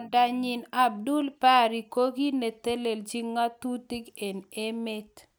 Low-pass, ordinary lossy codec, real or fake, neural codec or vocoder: none; none; real; none